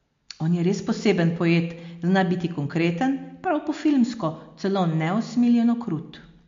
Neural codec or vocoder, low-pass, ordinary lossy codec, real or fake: none; 7.2 kHz; MP3, 48 kbps; real